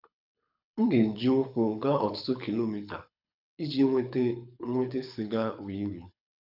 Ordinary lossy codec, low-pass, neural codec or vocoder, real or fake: none; 5.4 kHz; codec, 24 kHz, 6 kbps, HILCodec; fake